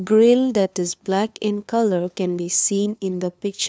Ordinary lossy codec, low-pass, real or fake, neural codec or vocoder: none; none; fake; codec, 16 kHz, 2 kbps, FunCodec, trained on LibriTTS, 25 frames a second